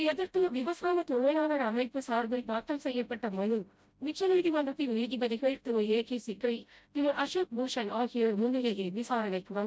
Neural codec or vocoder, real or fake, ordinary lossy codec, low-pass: codec, 16 kHz, 0.5 kbps, FreqCodec, smaller model; fake; none; none